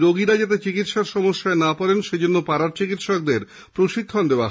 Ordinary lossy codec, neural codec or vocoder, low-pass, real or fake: none; none; none; real